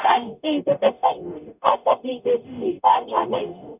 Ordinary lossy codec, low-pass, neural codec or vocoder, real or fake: none; 3.6 kHz; codec, 44.1 kHz, 0.9 kbps, DAC; fake